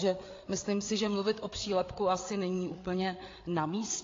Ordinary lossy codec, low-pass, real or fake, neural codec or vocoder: AAC, 32 kbps; 7.2 kHz; fake; codec, 16 kHz, 8 kbps, FreqCodec, larger model